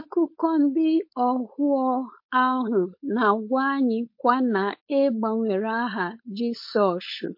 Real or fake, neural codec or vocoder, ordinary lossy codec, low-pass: fake; codec, 16 kHz, 4.8 kbps, FACodec; MP3, 32 kbps; 5.4 kHz